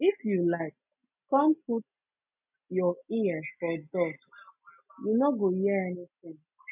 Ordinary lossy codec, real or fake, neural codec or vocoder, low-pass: none; real; none; 3.6 kHz